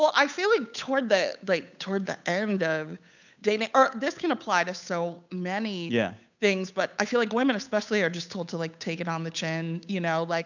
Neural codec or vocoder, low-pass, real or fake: codec, 16 kHz, 8 kbps, FunCodec, trained on Chinese and English, 25 frames a second; 7.2 kHz; fake